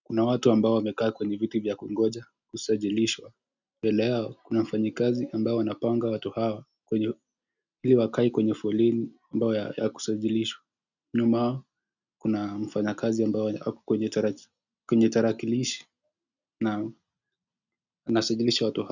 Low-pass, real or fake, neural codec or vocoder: 7.2 kHz; real; none